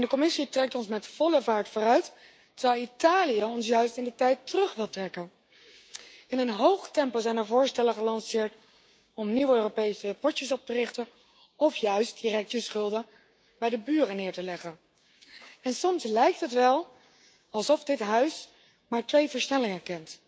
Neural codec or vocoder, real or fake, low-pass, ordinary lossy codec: codec, 16 kHz, 6 kbps, DAC; fake; none; none